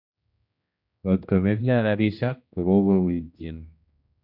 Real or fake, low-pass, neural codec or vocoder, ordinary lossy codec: fake; 5.4 kHz; codec, 16 kHz, 1 kbps, X-Codec, HuBERT features, trained on general audio; none